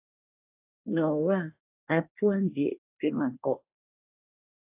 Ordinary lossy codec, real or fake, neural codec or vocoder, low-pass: AAC, 24 kbps; fake; codec, 24 kHz, 1 kbps, SNAC; 3.6 kHz